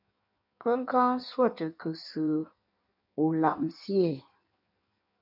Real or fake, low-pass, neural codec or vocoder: fake; 5.4 kHz; codec, 16 kHz in and 24 kHz out, 1.1 kbps, FireRedTTS-2 codec